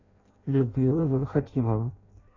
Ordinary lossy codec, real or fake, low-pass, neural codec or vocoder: AAC, 32 kbps; fake; 7.2 kHz; codec, 16 kHz in and 24 kHz out, 0.6 kbps, FireRedTTS-2 codec